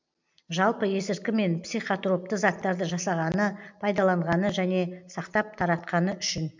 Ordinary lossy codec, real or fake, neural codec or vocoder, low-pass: MP3, 64 kbps; real; none; 7.2 kHz